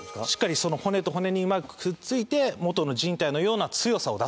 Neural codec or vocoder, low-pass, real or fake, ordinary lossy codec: none; none; real; none